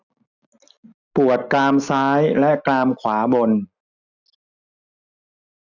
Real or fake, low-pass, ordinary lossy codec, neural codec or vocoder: real; 7.2 kHz; none; none